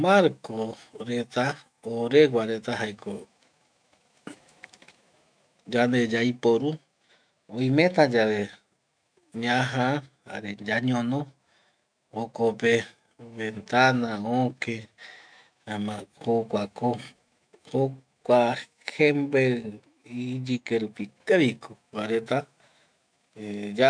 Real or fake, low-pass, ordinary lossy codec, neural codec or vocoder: fake; 9.9 kHz; none; autoencoder, 48 kHz, 128 numbers a frame, DAC-VAE, trained on Japanese speech